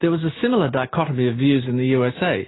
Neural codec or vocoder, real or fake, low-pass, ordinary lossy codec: none; real; 7.2 kHz; AAC, 16 kbps